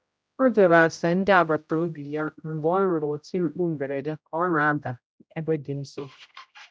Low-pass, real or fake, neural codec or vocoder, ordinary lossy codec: none; fake; codec, 16 kHz, 0.5 kbps, X-Codec, HuBERT features, trained on general audio; none